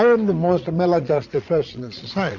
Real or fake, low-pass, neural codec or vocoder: fake; 7.2 kHz; vocoder, 22.05 kHz, 80 mel bands, Vocos